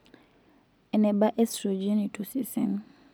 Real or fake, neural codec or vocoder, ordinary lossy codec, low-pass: real; none; none; none